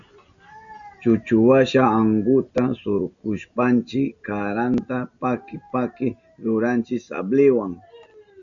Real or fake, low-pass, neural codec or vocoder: real; 7.2 kHz; none